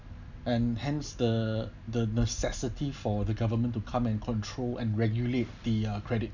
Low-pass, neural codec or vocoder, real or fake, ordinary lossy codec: 7.2 kHz; none; real; none